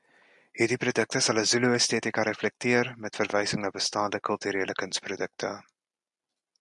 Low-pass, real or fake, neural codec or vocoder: 10.8 kHz; real; none